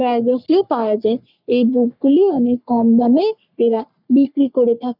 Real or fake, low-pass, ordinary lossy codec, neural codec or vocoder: fake; 5.4 kHz; none; codec, 44.1 kHz, 3.4 kbps, Pupu-Codec